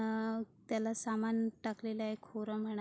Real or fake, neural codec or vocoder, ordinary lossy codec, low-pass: real; none; none; none